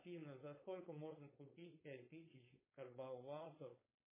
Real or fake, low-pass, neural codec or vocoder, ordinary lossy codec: fake; 3.6 kHz; codec, 16 kHz, 4.8 kbps, FACodec; MP3, 16 kbps